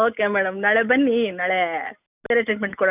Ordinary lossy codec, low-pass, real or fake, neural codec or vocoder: none; 3.6 kHz; real; none